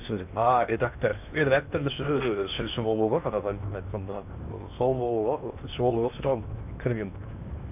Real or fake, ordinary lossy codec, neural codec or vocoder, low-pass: fake; none; codec, 16 kHz in and 24 kHz out, 0.8 kbps, FocalCodec, streaming, 65536 codes; 3.6 kHz